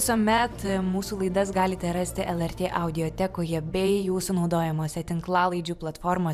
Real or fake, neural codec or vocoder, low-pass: fake; vocoder, 44.1 kHz, 128 mel bands every 512 samples, BigVGAN v2; 14.4 kHz